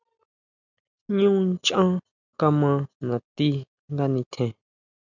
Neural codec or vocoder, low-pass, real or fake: none; 7.2 kHz; real